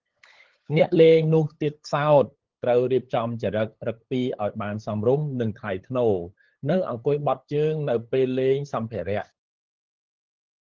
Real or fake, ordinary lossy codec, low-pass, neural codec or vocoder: fake; Opus, 16 kbps; 7.2 kHz; codec, 16 kHz, 8 kbps, FunCodec, trained on LibriTTS, 25 frames a second